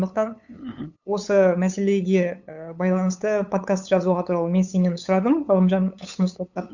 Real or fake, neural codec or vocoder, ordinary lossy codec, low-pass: fake; codec, 16 kHz, 8 kbps, FunCodec, trained on LibriTTS, 25 frames a second; none; 7.2 kHz